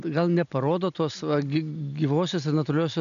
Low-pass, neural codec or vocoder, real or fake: 7.2 kHz; none; real